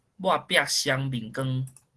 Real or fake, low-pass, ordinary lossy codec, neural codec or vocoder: real; 10.8 kHz; Opus, 16 kbps; none